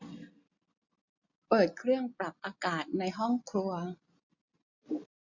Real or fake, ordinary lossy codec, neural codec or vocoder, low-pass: real; none; none; 7.2 kHz